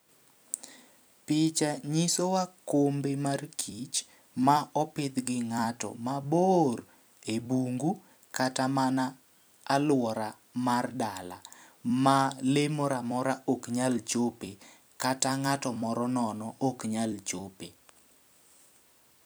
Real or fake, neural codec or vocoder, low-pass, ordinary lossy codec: fake; vocoder, 44.1 kHz, 128 mel bands every 256 samples, BigVGAN v2; none; none